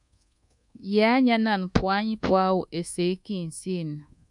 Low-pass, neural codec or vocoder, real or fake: 10.8 kHz; codec, 24 kHz, 1.2 kbps, DualCodec; fake